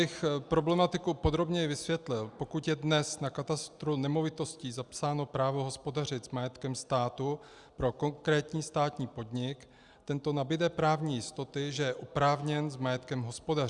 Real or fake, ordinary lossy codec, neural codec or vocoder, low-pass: real; Opus, 64 kbps; none; 10.8 kHz